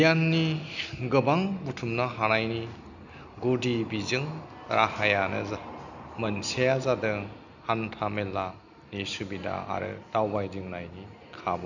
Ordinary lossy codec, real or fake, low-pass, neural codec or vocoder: none; real; 7.2 kHz; none